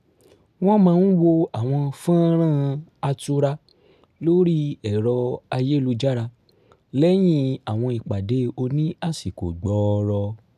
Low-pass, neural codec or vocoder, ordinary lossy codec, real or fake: 14.4 kHz; none; none; real